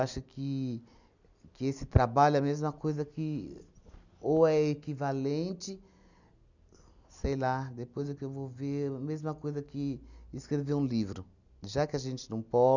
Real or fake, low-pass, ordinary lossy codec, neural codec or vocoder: real; 7.2 kHz; none; none